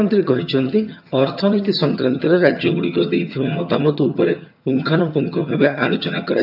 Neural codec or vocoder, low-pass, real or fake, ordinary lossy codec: vocoder, 22.05 kHz, 80 mel bands, HiFi-GAN; 5.4 kHz; fake; none